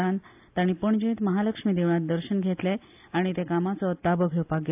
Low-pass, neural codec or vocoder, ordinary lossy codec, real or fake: 3.6 kHz; none; AAC, 32 kbps; real